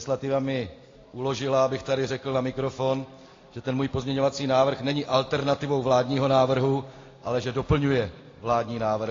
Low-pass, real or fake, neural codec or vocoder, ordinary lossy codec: 7.2 kHz; real; none; AAC, 32 kbps